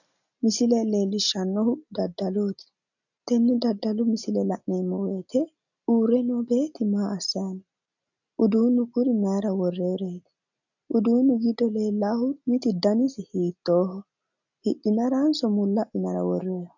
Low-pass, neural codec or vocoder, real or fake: 7.2 kHz; none; real